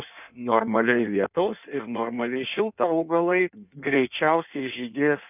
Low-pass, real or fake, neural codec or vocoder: 3.6 kHz; fake; codec, 16 kHz in and 24 kHz out, 1.1 kbps, FireRedTTS-2 codec